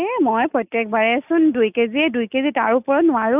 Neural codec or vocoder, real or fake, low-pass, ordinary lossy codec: none; real; 3.6 kHz; none